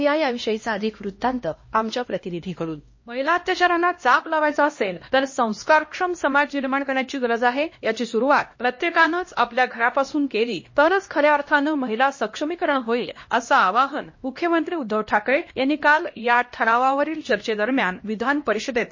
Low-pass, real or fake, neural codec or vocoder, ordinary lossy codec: 7.2 kHz; fake; codec, 16 kHz, 1 kbps, X-Codec, HuBERT features, trained on LibriSpeech; MP3, 32 kbps